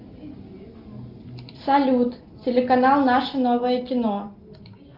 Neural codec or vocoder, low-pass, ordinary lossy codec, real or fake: none; 5.4 kHz; Opus, 32 kbps; real